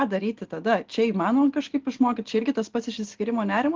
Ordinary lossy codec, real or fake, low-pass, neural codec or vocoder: Opus, 16 kbps; real; 7.2 kHz; none